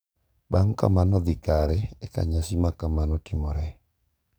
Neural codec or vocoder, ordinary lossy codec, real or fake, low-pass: codec, 44.1 kHz, 7.8 kbps, DAC; none; fake; none